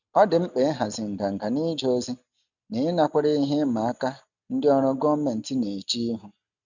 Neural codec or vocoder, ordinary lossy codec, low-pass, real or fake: none; none; 7.2 kHz; real